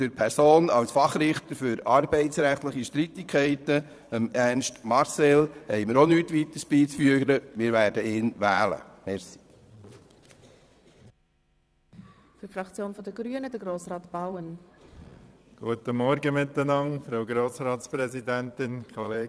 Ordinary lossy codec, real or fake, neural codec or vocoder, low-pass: none; fake; vocoder, 22.05 kHz, 80 mel bands, Vocos; none